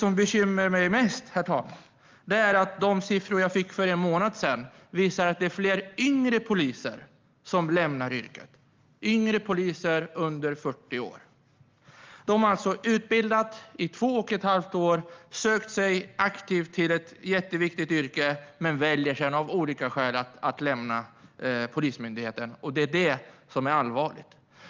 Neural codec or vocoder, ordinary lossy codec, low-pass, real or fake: none; Opus, 32 kbps; 7.2 kHz; real